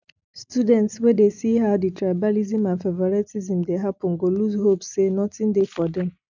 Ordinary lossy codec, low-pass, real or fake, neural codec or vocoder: none; 7.2 kHz; real; none